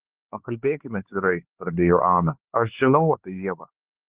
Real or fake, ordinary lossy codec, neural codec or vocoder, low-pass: fake; Opus, 16 kbps; codec, 16 kHz, 4 kbps, X-Codec, HuBERT features, trained on LibriSpeech; 3.6 kHz